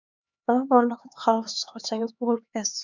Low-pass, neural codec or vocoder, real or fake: 7.2 kHz; codec, 16 kHz, 4 kbps, X-Codec, HuBERT features, trained on LibriSpeech; fake